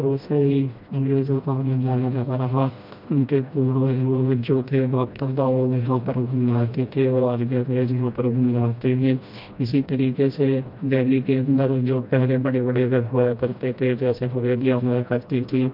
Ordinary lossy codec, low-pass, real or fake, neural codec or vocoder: MP3, 48 kbps; 5.4 kHz; fake; codec, 16 kHz, 1 kbps, FreqCodec, smaller model